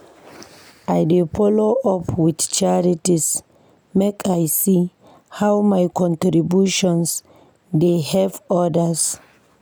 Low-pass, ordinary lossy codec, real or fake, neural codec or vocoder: none; none; real; none